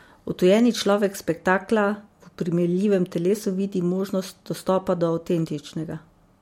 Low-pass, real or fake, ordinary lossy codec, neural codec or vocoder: 19.8 kHz; real; MP3, 64 kbps; none